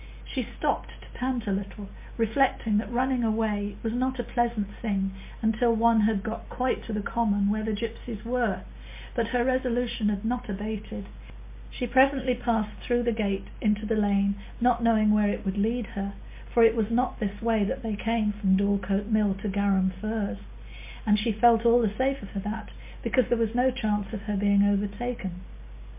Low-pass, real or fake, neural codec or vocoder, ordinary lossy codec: 3.6 kHz; real; none; MP3, 24 kbps